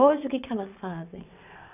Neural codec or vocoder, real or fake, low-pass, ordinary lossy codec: vocoder, 22.05 kHz, 80 mel bands, WaveNeXt; fake; 3.6 kHz; none